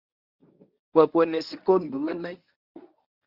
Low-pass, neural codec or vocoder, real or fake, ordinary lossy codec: 5.4 kHz; codec, 24 kHz, 0.9 kbps, WavTokenizer, medium speech release version 1; fake; Opus, 64 kbps